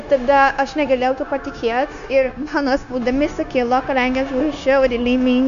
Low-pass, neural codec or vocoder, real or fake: 7.2 kHz; codec, 16 kHz, 0.9 kbps, LongCat-Audio-Codec; fake